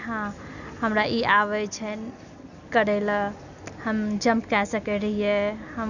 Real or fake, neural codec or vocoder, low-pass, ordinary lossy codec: real; none; 7.2 kHz; none